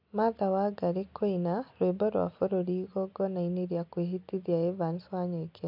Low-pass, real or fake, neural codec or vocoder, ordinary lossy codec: 5.4 kHz; real; none; MP3, 48 kbps